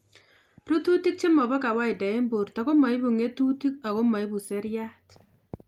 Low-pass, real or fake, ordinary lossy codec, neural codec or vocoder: 19.8 kHz; real; Opus, 32 kbps; none